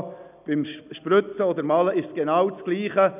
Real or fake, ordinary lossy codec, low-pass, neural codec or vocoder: real; none; 3.6 kHz; none